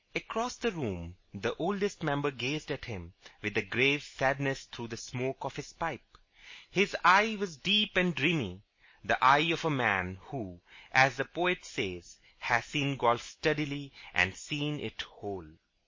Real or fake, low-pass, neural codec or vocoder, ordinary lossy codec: real; 7.2 kHz; none; MP3, 32 kbps